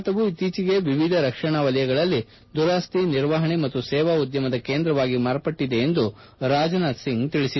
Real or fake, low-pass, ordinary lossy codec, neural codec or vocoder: real; 7.2 kHz; MP3, 24 kbps; none